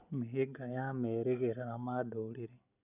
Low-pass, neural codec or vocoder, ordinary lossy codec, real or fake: 3.6 kHz; none; none; real